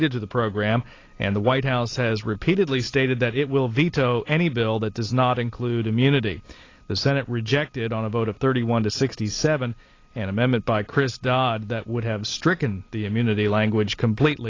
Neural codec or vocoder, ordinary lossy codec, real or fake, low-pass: none; AAC, 32 kbps; real; 7.2 kHz